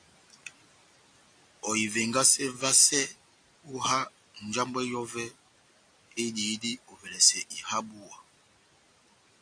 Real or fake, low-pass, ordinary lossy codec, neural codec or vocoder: real; 9.9 kHz; AAC, 64 kbps; none